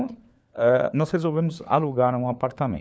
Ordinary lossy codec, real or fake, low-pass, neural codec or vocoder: none; fake; none; codec, 16 kHz, 4 kbps, FunCodec, trained on LibriTTS, 50 frames a second